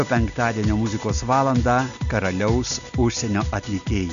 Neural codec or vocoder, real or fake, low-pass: none; real; 7.2 kHz